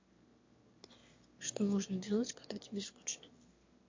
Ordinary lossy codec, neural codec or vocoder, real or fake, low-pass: MP3, 48 kbps; autoencoder, 22.05 kHz, a latent of 192 numbers a frame, VITS, trained on one speaker; fake; 7.2 kHz